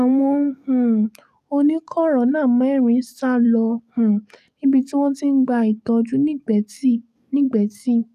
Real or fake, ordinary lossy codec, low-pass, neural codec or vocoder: fake; none; 14.4 kHz; codec, 44.1 kHz, 7.8 kbps, DAC